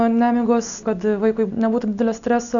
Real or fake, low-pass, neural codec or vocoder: real; 7.2 kHz; none